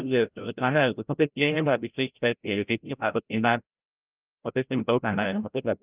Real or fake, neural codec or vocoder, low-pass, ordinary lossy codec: fake; codec, 16 kHz, 0.5 kbps, FreqCodec, larger model; 3.6 kHz; Opus, 24 kbps